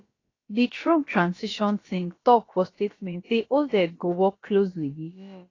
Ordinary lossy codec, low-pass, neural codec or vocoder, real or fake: AAC, 32 kbps; 7.2 kHz; codec, 16 kHz, about 1 kbps, DyCAST, with the encoder's durations; fake